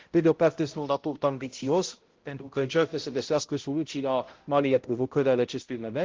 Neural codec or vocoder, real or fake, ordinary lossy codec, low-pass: codec, 16 kHz, 0.5 kbps, X-Codec, HuBERT features, trained on balanced general audio; fake; Opus, 16 kbps; 7.2 kHz